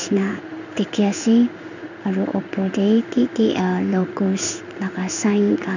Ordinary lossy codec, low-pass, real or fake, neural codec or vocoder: none; 7.2 kHz; fake; codec, 16 kHz in and 24 kHz out, 1 kbps, XY-Tokenizer